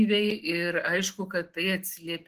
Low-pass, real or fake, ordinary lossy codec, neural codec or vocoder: 14.4 kHz; real; Opus, 16 kbps; none